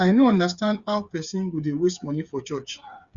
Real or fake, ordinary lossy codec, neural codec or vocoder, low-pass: fake; Opus, 64 kbps; codec, 16 kHz, 8 kbps, FreqCodec, smaller model; 7.2 kHz